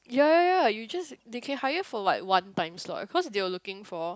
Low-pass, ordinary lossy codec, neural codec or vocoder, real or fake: none; none; none; real